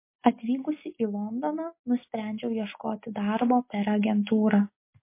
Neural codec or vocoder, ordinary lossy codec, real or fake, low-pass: none; MP3, 24 kbps; real; 3.6 kHz